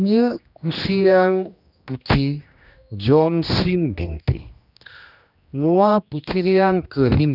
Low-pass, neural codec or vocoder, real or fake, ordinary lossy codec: 5.4 kHz; codec, 16 kHz, 1 kbps, X-Codec, HuBERT features, trained on general audio; fake; none